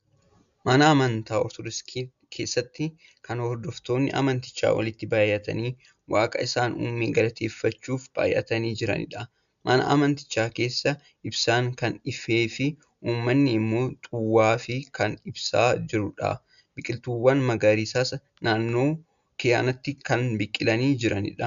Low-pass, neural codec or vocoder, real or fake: 7.2 kHz; none; real